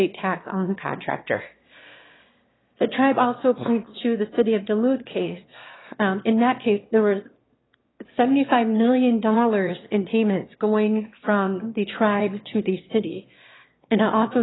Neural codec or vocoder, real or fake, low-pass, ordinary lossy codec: autoencoder, 22.05 kHz, a latent of 192 numbers a frame, VITS, trained on one speaker; fake; 7.2 kHz; AAC, 16 kbps